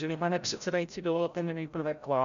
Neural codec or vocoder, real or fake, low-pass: codec, 16 kHz, 0.5 kbps, FreqCodec, larger model; fake; 7.2 kHz